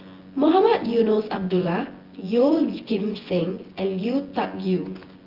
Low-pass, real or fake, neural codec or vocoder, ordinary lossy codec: 5.4 kHz; fake; vocoder, 24 kHz, 100 mel bands, Vocos; Opus, 16 kbps